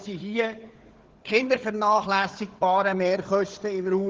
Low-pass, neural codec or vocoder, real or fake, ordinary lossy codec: 7.2 kHz; codec, 16 kHz, 4 kbps, FunCodec, trained on Chinese and English, 50 frames a second; fake; Opus, 16 kbps